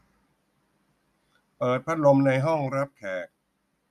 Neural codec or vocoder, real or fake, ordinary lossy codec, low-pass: none; real; MP3, 96 kbps; 14.4 kHz